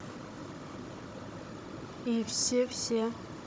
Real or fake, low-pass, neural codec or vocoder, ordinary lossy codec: fake; none; codec, 16 kHz, 16 kbps, FunCodec, trained on Chinese and English, 50 frames a second; none